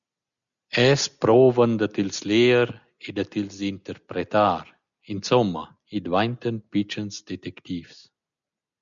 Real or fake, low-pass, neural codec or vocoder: real; 7.2 kHz; none